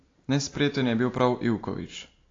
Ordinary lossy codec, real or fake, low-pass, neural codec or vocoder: AAC, 32 kbps; real; 7.2 kHz; none